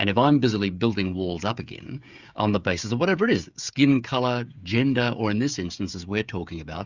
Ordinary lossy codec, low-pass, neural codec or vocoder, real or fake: Opus, 64 kbps; 7.2 kHz; codec, 16 kHz, 16 kbps, FreqCodec, smaller model; fake